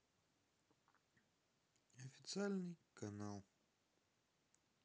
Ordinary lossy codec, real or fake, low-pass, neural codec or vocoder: none; real; none; none